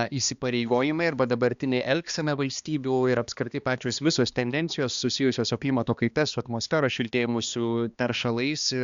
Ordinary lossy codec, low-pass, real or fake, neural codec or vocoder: Opus, 64 kbps; 7.2 kHz; fake; codec, 16 kHz, 2 kbps, X-Codec, HuBERT features, trained on balanced general audio